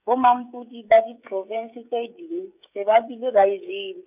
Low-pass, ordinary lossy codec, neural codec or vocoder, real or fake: 3.6 kHz; none; codec, 16 kHz, 16 kbps, FreqCodec, smaller model; fake